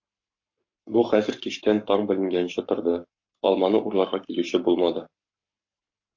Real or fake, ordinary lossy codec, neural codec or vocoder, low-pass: fake; MP3, 48 kbps; codec, 44.1 kHz, 7.8 kbps, Pupu-Codec; 7.2 kHz